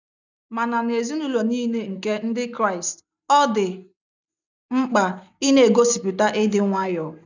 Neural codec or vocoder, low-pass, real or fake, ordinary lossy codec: none; 7.2 kHz; real; none